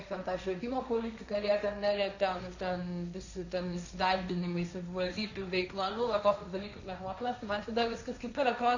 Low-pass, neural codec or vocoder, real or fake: 7.2 kHz; codec, 16 kHz, 1.1 kbps, Voila-Tokenizer; fake